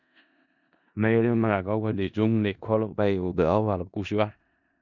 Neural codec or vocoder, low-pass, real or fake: codec, 16 kHz in and 24 kHz out, 0.4 kbps, LongCat-Audio-Codec, four codebook decoder; 7.2 kHz; fake